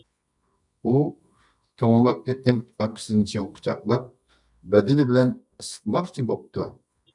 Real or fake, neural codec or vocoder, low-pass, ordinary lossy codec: fake; codec, 24 kHz, 0.9 kbps, WavTokenizer, medium music audio release; 10.8 kHz; MP3, 96 kbps